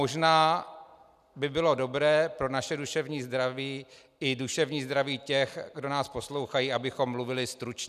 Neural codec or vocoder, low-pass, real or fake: none; 14.4 kHz; real